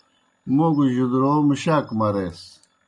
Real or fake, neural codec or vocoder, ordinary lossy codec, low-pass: real; none; MP3, 96 kbps; 10.8 kHz